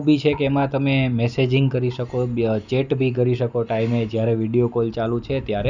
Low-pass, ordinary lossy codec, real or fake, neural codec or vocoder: 7.2 kHz; none; real; none